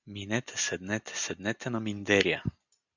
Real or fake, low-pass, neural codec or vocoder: real; 7.2 kHz; none